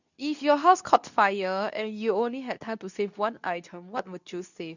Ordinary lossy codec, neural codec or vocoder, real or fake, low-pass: none; codec, 24 kHz, 0.9 kbps, WavTokenizer, medium speech release version 2; fake; 7.2 kHz